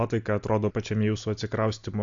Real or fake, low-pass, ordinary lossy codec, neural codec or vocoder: real; 7.2 kHz; AAC, 64 kbps; none